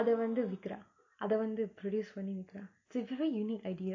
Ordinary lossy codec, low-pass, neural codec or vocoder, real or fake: AAC, 48 kbps; 7.2 kHz; none; real